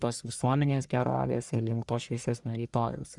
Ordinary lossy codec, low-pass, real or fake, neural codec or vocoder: Opus, 64 kbps; 10.8 kHz; fake; codec, 44.1 kHz, 1.7 kbps, Pupu-Codec